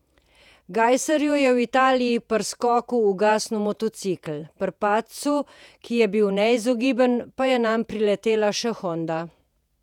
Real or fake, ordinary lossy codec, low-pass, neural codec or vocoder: fake; none; 19.8 kHz; vocoder, 48 kHz, 128 mel bands, Vocos